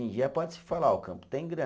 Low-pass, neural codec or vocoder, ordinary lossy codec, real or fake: none; none; none; real